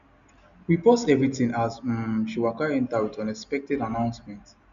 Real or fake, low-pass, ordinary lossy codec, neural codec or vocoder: real; 7.2 kHz; none; none